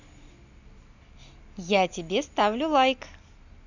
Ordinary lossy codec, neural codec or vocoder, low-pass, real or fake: none; none; 7.2 kHz; real